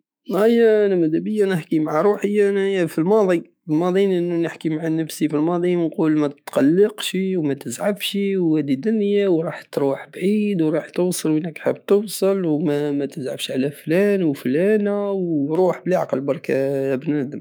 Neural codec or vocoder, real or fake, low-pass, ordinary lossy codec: autoencoder, 48 kHz, 128 numbers a frame, DAC-VAE, trained on Japanese speech; fake; none; none